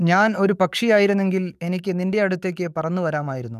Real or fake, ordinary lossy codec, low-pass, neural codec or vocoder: fake; none; 14.4 kHz; codec, 44.1 kHz, 7.8 kbps, DAC